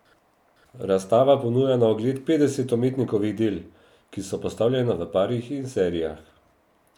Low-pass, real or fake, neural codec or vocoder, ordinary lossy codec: 19.8 kHz; real; none; none